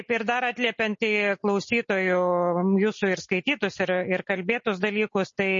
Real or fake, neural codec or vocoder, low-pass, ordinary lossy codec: real; none; 7.2 kHz; MP3, 32 kbps